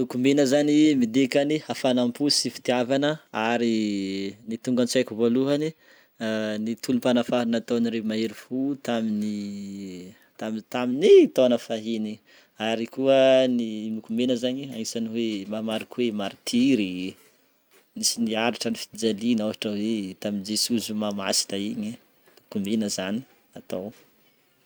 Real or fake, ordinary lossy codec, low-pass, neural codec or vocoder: real; none; none; none